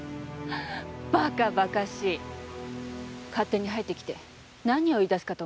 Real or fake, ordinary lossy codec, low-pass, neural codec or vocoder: real; none; none; none